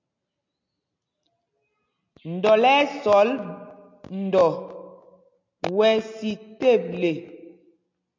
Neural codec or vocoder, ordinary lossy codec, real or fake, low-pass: none; MP3, 48 kbps; real; 7.2 kHz